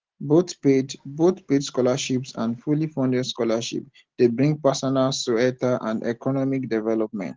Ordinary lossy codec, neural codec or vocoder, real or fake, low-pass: Opus, 16 kbps; none; real; 7.2 kHz